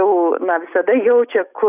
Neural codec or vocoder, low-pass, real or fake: none; 3.6 kHz; real